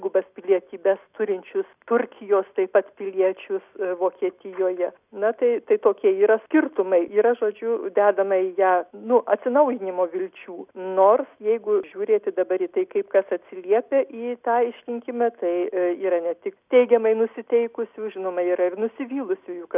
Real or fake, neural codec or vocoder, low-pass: real; none; 3.6 kHz